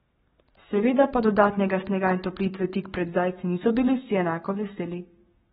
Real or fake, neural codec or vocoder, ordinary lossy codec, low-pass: fake; codec, 44.1 kHz, 7.8 kbps, Pupu-Codec; AAC, 16 kbps; 19.8 kHz